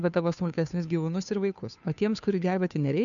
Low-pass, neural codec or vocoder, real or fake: 7.2 kHz; codec, 16 kHz, 2 kbps, FunCodec, trained on Chinese and English, 25 frames a second; fake